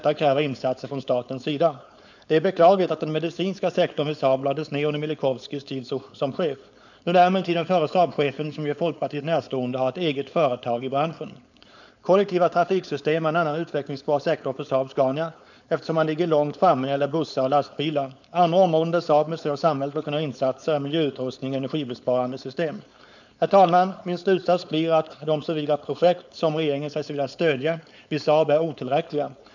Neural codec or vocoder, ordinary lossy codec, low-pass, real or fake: codec, 16 kHz, 4.8 kbps, FACodec; none; 7.2 kHz; fake